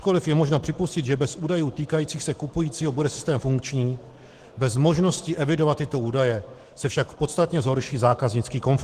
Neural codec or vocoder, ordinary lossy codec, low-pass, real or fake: autoencoder, 48 kHz, 128 numbers a frame, DAC-VAE, trained on Japanese speech; Opus, 16 kbps; 14.4 kHz; fake